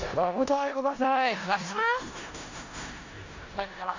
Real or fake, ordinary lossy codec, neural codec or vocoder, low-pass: fake; AAC, 48 kbps; codec, 16 kHz in and 24 kHz out, 0.4 kbps, LongCat-Audio-Codec, four codebook decoder; 7.2 kHz